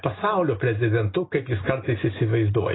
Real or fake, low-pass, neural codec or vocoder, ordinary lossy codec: real; 7.2 kHz; none; AAC, 16 kbps